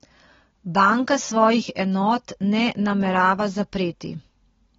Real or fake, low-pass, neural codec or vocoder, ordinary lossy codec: real; 7.2 kHz; none; AAC, 24 kbps